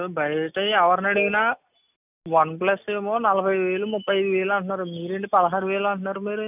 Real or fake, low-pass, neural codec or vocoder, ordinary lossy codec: real; 3.6 kHz; none; none